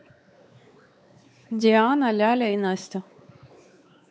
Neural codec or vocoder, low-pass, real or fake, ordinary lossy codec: codec, 16 kHz, 4 kbps, X-Codec, WavLM features, trained on Multilingual LibriSpeech; none; fake; none